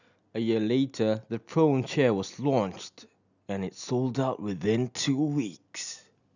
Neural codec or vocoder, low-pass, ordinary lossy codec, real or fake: none; 7.2 kHz; none; real